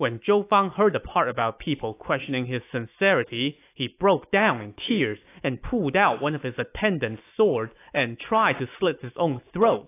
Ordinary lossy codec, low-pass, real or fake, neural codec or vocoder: AAC, 24 kbps; 3.6 kHz; fake; autoencoder, 48 kHz, 128 numbers a frame, DAC-VAE, trained on Japanese speech